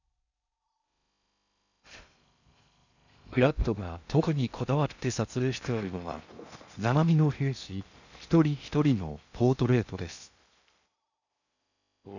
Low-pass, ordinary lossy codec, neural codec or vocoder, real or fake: 7.2 kHz; none; codec, 16 kHz in and 24 kHz out, 0.6 kbps, FocalCodec, streaming, 2048 codes; fake